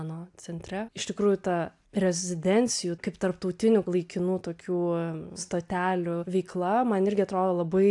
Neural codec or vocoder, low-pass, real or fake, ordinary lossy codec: none; 10.8 kHz; real; AAC, 64 kbps